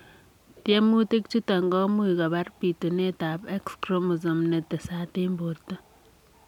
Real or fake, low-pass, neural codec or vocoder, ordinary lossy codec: real; 19.8 kHz; none; none